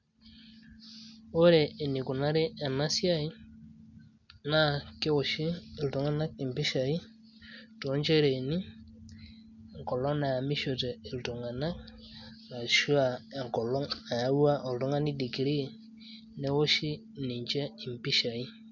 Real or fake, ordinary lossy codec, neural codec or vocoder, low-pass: real; none; none; 7.2 kHz